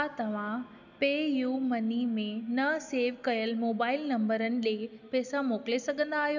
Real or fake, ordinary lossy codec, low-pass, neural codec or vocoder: real; none; 7.2 kHz; none